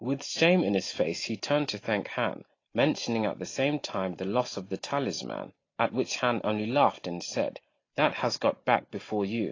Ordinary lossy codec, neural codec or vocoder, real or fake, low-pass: AAC, 32 kbps; none; real; 7.2 kHz